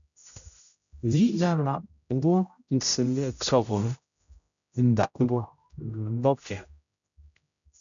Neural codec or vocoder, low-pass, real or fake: codec, 16 kHz, 0.5 kbps, X-Codec, HuBERT features, trained on general audio; 7.2 kHz; fake